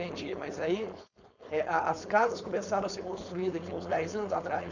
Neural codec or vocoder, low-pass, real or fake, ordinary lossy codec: codec, 16 kHz, 4.8 kbps, FACodec; 7.2 kHz; fake; none